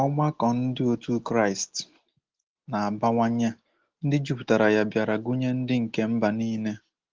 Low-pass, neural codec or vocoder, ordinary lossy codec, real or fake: 7.2 kHz; none; Opus, 16 kbps; real